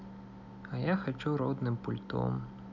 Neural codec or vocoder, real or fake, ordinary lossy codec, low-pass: none; real; none; 7.2 kHz